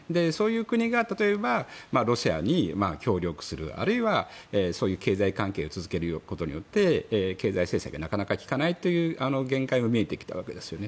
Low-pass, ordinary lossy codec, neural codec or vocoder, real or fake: none; none; none; real